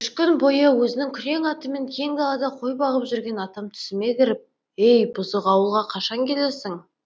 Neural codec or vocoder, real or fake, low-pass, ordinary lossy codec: none; real; none; none